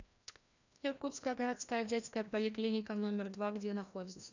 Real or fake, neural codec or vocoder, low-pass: fake; codec, 16 kHz, 1 kbps, FreqCodec, larger model; 7.2 kHz